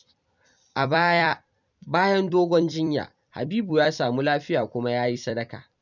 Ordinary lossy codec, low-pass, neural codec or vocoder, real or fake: none; 7.2 kHz; vocoder, 44.1 kHz, 128 mel bands every 256 samples, BigVGAN v2; fake